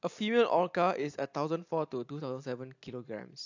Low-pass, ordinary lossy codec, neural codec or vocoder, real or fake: 7.2 kHz; AAC, 48 kbps; none; real